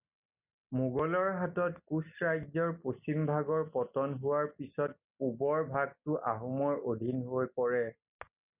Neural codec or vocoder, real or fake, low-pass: none; real; 3.6 kHz